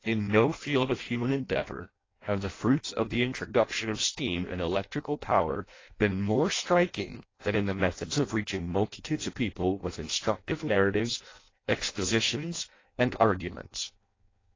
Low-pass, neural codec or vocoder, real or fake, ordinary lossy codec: 7.2 kHz; codec, 16 kHz in and 24 kHz out, 0.6 kbps, FireRedTTS-2 codec; fake; AAC, 32 kbps